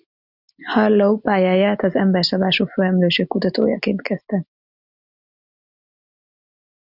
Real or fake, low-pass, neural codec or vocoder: real; 5.4 kHz; none